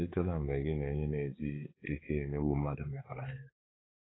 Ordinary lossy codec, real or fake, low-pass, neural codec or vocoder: AAC, 16 kbps; fake; 7.2 kHz; codec, 16 kHz, 4 kbps, X-Codec, HuBERT features, trained on balanced general audio